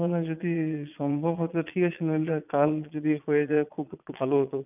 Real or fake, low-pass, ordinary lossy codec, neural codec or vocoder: fake; 3.6 kHz; none; vocoder, 22.05 kHz, 80 mel bands, Vocos